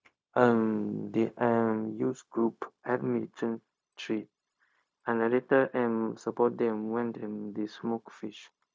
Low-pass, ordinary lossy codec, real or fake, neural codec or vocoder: none; none; fake; codec, 16 kHz, 0.4 kbps, LongCat-Audio-Codec